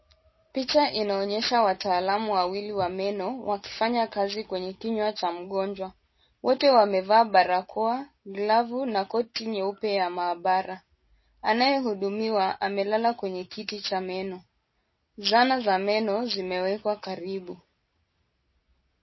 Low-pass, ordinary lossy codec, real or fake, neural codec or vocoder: 7.2 kHz; MP3, 24 kbps; real; none